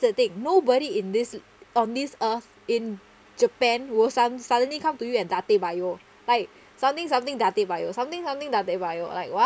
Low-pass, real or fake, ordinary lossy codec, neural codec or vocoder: none; real; none; none